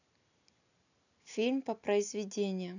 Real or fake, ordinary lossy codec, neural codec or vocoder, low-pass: real; none; none; 7.2 kHz